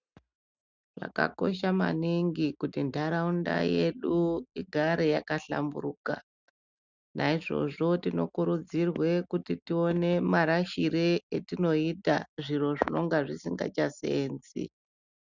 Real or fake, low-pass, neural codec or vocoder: real; 7.2 kHz; none